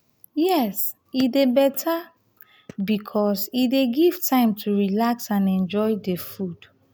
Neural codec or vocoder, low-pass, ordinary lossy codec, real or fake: none; none; none; real